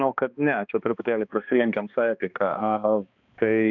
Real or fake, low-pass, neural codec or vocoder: fake; 7.2 kHz; codec, 16 kHz, 2 kbps, X-Codec, HuBERT features, trained on balanced general audio